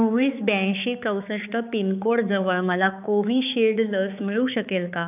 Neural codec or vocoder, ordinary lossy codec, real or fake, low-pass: codec, 16 kHz, 4 kbps, X-Codec, HuBERT features, trained on balanced general audio; none; fake; 3.6 kHz